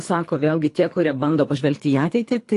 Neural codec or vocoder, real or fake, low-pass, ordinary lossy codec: codec, 24 kHz, 3 kbps, HILCodec; fake; 10.8 kHz; AAC, 48 kbps